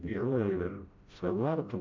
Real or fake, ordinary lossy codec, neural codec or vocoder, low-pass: fake; MP3, 48 kbps; codec, 16 kHz, 0.5 kbps, FreqCodec, smaller model; 7.2 kHz